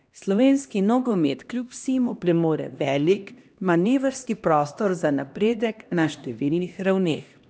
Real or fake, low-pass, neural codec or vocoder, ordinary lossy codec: fake; none; codec, 16 kHz, 1 kbps, X-Codec, HuBERT features, trained on LibriSpeech; none